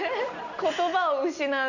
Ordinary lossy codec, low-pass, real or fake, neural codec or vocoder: none; 7.2 kHz; real; none